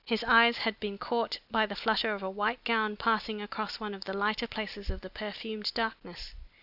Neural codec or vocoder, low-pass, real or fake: none; 5.4 kHz; real